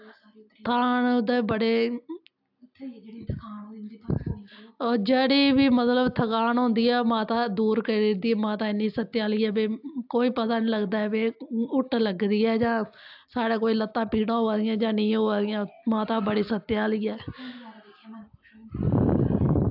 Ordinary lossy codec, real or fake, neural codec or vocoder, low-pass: AAC, 48 kbps; real; none; 5.4 kHz